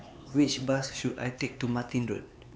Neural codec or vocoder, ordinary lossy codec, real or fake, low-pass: codec, 16 kHz, 4 kbps, X-Codec, HuBERT features, trained on LibriSpeech; none; fake; none